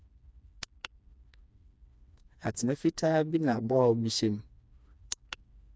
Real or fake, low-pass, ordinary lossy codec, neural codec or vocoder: fake; none; none; codec, 16 kHz, 2 kbps, FreqCodec, smaller model